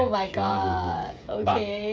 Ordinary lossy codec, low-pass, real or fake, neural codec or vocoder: none; none; fake; codec, 16 kHz, 16 kbps, FreqCodec, smaller model